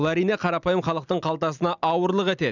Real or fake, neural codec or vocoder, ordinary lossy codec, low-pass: real; none; none; 7.2 kHz